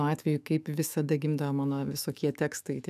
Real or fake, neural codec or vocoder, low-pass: fake; autoencoder, 48 kHz, 128 numbers a frame, DAC-VAE, trained on Japanese speech; 14.4 kHz